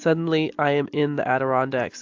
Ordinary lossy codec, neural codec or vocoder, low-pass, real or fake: AAC, 48 kbps; none; 7.2 kHz; real